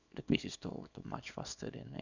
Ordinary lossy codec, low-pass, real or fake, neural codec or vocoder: none; 7.2 kHz; fake; codec, 24 kHz, 0.9 kbps, WavTokenizer, small release